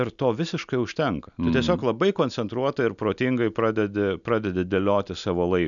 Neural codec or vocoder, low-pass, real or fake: none; 7.2 kHz; real